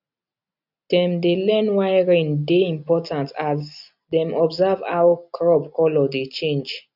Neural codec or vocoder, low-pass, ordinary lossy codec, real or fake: none; 5.4 kHz; none; real